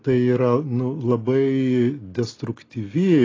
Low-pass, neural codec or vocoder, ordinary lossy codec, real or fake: 7.2 kHz; none; AAC, 32 kbps; real